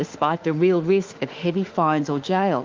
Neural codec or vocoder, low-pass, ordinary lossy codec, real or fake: autoencoder, 48 kHz, 32 numbers a frame, DAC-VAE, trained on Japanese speech; 7.2 kHz; Opus, 24 kbps; fake